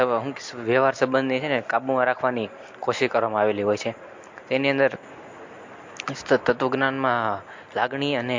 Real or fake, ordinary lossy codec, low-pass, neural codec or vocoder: real; MP3, 48 kbps; 7.2 kHz; none